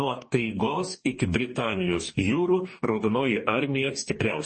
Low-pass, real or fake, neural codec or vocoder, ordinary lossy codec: 10.8 kHz; fake; codec, 44.1 kHz, 2.6 kbps, DAC; MP3, 32 kbps